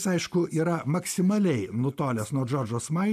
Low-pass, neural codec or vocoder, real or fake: 14.4 kHz; none; real